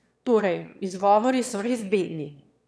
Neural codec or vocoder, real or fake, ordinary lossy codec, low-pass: autoencoder, 22.05 kHz, a latent of 192 numbers a frame, VITS, trained on one speaker; fake; none; none